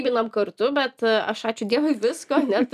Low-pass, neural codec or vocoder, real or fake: 14.4 kHz; none; real